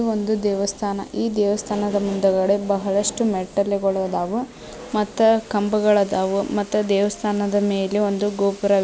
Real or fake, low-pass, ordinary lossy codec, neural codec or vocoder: real; none; none; none